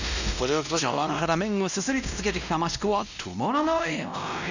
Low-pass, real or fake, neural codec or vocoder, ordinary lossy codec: 7.2 kHz; fake; codec, 16 kHz, 1 kbps, X-Codec, WavLM features, trained on Multilingual LibriSpeech; none